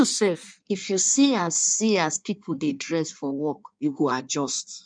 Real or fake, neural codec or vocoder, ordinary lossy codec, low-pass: fake; codec, 16 kHz in and 24 kHz out, 1.1 kbps, FireRedTTS-2 codec; none; 9.9 kHz